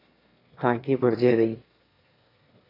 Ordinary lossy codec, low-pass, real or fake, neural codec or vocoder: AAC, 24 kbps; 5.4 kHz; fake; autoencoder, 22.05 kHz, a latent of 192 numbers a frame, VITS, trained on one speaker